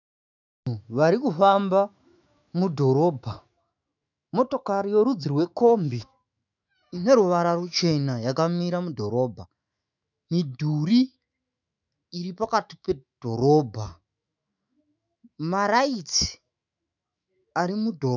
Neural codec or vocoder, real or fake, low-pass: autoencoder, 48 kHz, 128 numbers a frame, DAC-VAE, trained on Japanese speech; fake; 7.2 kHz